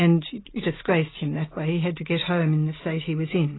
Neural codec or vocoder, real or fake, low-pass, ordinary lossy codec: none; real; 7.2 kHz; AAC, 16 kbps